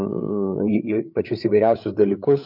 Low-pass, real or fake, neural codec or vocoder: 5.4 kHz; fake; codec, 16 kHz, 8 kbps, FreqCodec, larger model